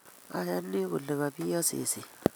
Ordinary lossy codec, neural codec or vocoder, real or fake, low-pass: none; none; real; none